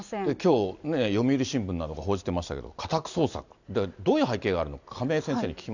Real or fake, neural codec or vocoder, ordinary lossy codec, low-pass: real; none; none; 7.2 kHz